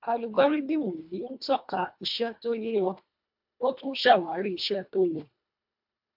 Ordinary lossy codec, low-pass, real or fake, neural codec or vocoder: MP3, 48 kbps; 5.4 kHz; fake; codec, 24 kHz, 1.5 kbps, HILCodec